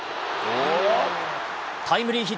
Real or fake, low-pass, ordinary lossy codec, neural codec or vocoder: real; none; none; none